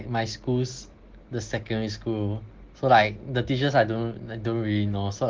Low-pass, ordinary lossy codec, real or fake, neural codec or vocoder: 7.2 kHz; Opus, 16 kbps; real; none